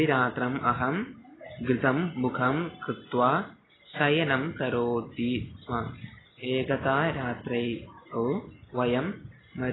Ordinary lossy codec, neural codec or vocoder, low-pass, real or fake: AAC, 16 kbps; none; 7.2 kHz; real